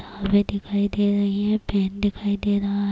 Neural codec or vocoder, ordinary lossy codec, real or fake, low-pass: none; none; real; none